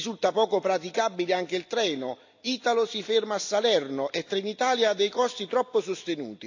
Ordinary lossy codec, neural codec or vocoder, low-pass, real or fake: AAC, 48 kbps; none; 7.2 kHz; real